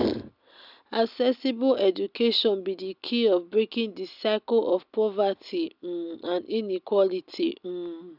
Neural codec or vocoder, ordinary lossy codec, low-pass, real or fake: none; none; 5.4 kHz; real